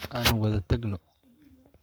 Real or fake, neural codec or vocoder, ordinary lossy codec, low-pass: fake; codec, 44.1 kHz, 7.8 kbps, Pupu-Codec; none; none